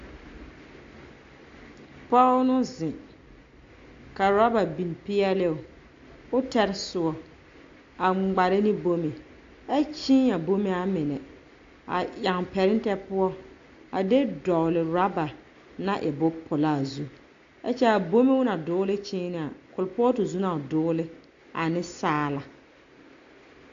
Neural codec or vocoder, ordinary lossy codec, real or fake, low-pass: none; AAC, 48 kbps; real; 7.2 kHz